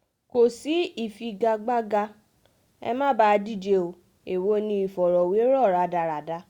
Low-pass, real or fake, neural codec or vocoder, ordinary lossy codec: 19.8 kHz; real; none; Opus, 64 kbps